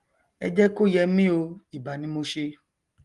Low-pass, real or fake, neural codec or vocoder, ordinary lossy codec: 10.8 kHz; real; none; Opus, 24 kbps